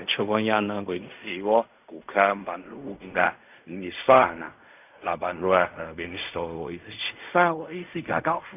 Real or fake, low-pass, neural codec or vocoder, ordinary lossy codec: fake; 3.6 kHz; codec, 16 kHz in and 24 kHz out, 0.4 kbps, LongCat-Audio-Codec, fine tuned four codebook decoder; none